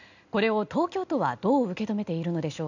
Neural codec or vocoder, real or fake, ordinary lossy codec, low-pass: none; real; none; 7.2 kHz